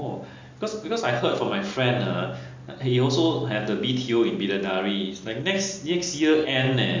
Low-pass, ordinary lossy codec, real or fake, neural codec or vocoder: 7.2 kHz; MP3, 64 kbps; real; none